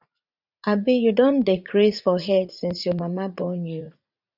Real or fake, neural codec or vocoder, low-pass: fake; vocoder, 44.1 kHz, 80 mel bands, Vocos; 5.4 kHz